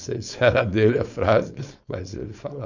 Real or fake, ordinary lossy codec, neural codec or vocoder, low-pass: fake; none; codec, 16 kHz, 4.8 kbps, FACodec; 7.2 kHz